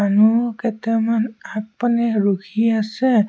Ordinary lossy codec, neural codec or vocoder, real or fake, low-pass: none; none; real; none